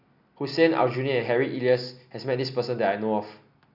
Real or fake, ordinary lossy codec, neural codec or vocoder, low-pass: real; none; none; 5.4 kHz